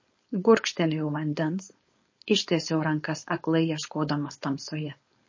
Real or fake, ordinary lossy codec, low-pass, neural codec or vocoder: fake; MP3, 32 kbps; 7.2 kHz; codec, 16 kHz, 4.8 kbps, FACodec